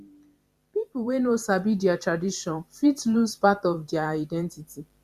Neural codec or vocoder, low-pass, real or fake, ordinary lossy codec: vocoder, 44.1 kHz, 128 mel bands every 512 samples, BigVGAN v2; 14.4 kHz; fake; Opus, 64 kbps